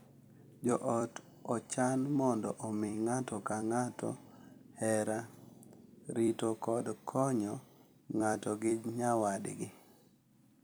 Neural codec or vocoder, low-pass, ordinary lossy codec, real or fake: vocoder, 44.1 kHz, 128 mel bands every 256 samples, BigVGAN v2; none; none; fake